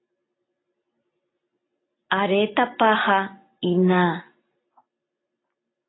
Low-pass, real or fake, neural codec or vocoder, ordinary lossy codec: 7.2 kHz; real; none; AAC, 16 kbps